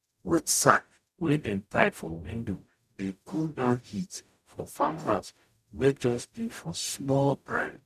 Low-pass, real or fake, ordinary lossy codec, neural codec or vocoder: 14.4 kHz; fake; none; codec, 44.1 kHz, 0.9 kbps, DAC